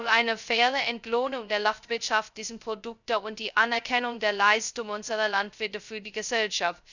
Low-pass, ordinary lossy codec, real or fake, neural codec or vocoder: 7.2 kHz; none; fake; codec, 16 kHz, 0.2 kbps, FocalCodec